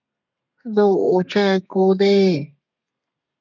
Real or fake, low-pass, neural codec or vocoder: fake; 7.2 kHz; codec, 32 kHz, 1.9 kbps, SNAC